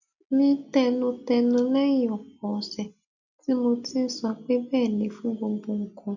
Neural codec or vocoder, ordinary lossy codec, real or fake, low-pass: none; none; real; 7.2 kHz